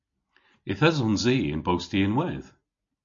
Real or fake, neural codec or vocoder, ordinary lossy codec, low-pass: real; none; MP3, 48 kbps; 7.2 kHz